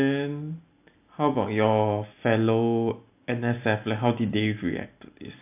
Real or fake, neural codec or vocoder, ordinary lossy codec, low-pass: real; none; AAC, 32 kbps; 3.6 kHz